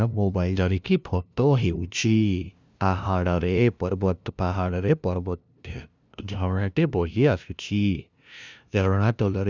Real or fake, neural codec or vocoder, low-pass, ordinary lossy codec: fake; codec, 16 kHz, 0.5 kbps, FunCodec, trained on LibriTTS, 25 frames a second; none; none